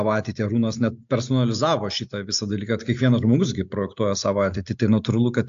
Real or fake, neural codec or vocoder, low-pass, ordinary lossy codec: real; none; 7.2 kHz; AAC, 64 kbps